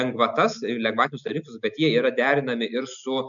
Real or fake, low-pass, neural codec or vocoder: real; 7.2 kHz; none